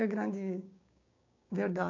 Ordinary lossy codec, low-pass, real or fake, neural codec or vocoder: MP3, 48 kbps; 7.2 kHz; fake; codec, 16 kHz, 6 kbps, DAC